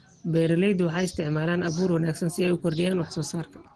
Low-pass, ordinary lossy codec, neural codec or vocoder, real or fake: 9.9 kHz; Opus, 16 kbps; none; real